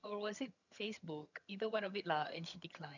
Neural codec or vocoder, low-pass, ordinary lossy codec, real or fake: vocoder, 22.05 kHz, 80 mel bands, HiFi-GAN; 7.2 kHz; none; fake